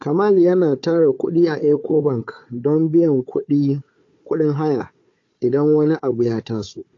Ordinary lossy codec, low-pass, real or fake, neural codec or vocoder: AAC, 48 kbps; 7.2 kHz; fake; codec, 16 kHz, 4 kbps, FunCodec, trained on Chinese and English, 50 frames a second